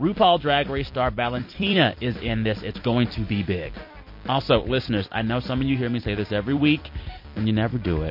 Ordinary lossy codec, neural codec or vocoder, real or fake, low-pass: MP3, 32 kbps; vocoder, 44.1 kHz, 128 mel bands every 256 samples, BigVGAN v2; fake; 5.4 kHz